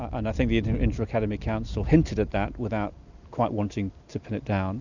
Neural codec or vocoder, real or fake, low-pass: none; real; 7.2 kHz